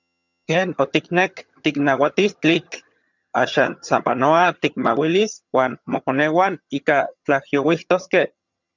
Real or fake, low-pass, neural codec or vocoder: fake; 7.2 kHz; vocoder, 22.05 kHz, 80 mel bands, HiFi-GAN